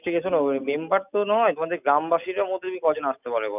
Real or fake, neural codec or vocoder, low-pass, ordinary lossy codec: real; none; 3.6 kHz; none